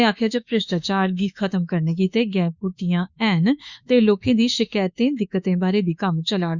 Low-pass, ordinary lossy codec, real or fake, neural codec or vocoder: 7.2 kHz; Opus, 64 kbps; fake; autoencoder, 48 kHz, 32 numbers a frame, DAC-VAE, trained on Japanese speech